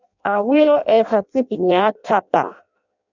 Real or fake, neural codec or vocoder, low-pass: fake; codec, 16 kHz in and 24 kHz out, 0.6 kbps, FireRedTTS-2 codec; 7.2 kHz